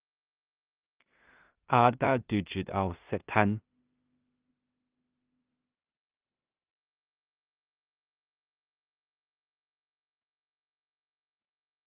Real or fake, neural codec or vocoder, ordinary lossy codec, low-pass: fake; codec, 16 kHz in and 24 kHz out, 0.4 kbps, LongCat-Audio-Codec, two codebook decoder; Opus, 24 kbps; 3.6 kHz